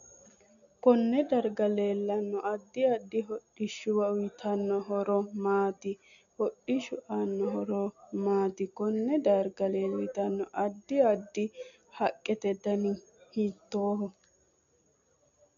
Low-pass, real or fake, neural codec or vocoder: 7.2 kHz; real; none